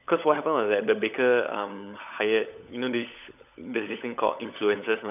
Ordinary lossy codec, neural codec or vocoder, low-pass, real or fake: none; codec, 16 kHz, 16 kbps, FunCodec, trained on LibriTTS, 50 frames a second; 3.6 kHz; fake